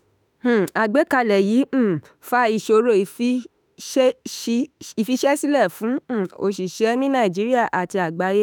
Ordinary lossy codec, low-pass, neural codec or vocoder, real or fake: none; none; autoencoder, 48 kHz, 32 numbers a frame, DAC-VAE, trained on Japanese speech; fake